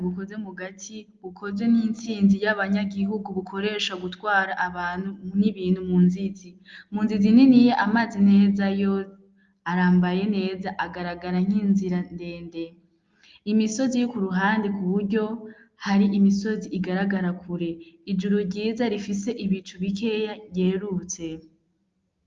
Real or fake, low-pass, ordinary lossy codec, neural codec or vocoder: real; 7.2 kHz; Opus, 32 kbps; none